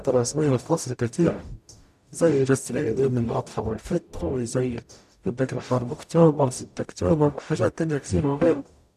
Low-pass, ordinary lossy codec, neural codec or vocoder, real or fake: 14.4 kHz; none; codec, 44.1 kHz, 0.9 kbps, DAC; fake